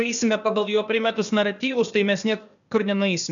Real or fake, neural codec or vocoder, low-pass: fake; codec, 16 kHz, 0.8 kbps, ZipCodec; 7.2 kHz